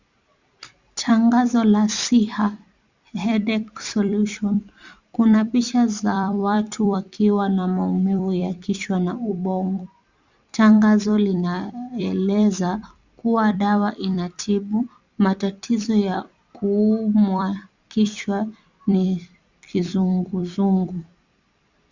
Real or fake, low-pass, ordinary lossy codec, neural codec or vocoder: fake; 7.2 kHz; Opus, 64 kbps; vocoder, 44.1 kHz, 128 mel bands every 256 samples, BigVGAN v2